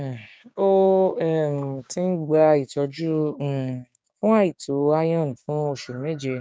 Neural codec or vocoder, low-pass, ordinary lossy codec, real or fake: codec, 16 kHz, 6 kbps, DAC; none; none; fake